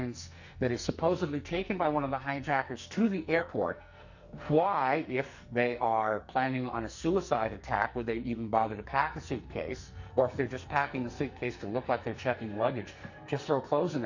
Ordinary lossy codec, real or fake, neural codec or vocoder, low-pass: Opus, 64 kbps; fake; codec, 44.1 kHz, 2.6 kbps, SNAC; 7.2 kHz